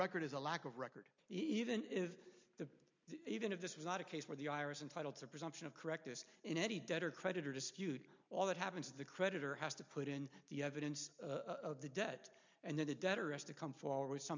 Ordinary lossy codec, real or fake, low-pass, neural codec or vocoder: MP3, 64 kbps; real; 7.2 kHz; none